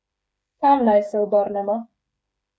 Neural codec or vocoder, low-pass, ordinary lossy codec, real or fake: codec, 16 kHz, 8 kbps, FreqCodec, smaller model; none; none; fake